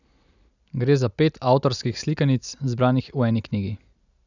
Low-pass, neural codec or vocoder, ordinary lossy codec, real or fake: 7.2 kHz; none; none; real